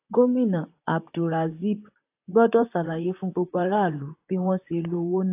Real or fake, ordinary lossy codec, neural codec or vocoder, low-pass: fake; AAC, 24 kbps; vocoder, 44.1 kHz, 128 mel bands, Pupu-Vocoder; 3.6 kHz